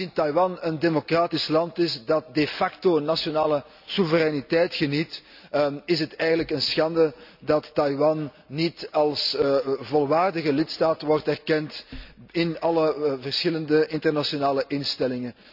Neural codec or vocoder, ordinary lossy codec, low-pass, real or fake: none; none; 5.4 kHz; real